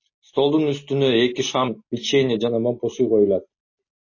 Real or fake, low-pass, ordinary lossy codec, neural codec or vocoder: real; 7.2 kHz; MP3, 32 kbps; none